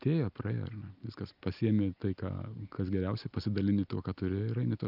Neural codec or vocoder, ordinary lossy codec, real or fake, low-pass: none; Opus, 24 kbps; real; 5.4 kHz